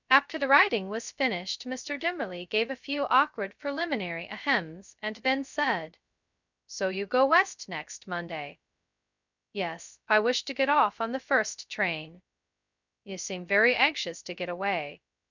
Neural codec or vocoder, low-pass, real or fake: codec, 16 kHz, 0.2 kbps, FocalCodec; 7.2 kHz; fake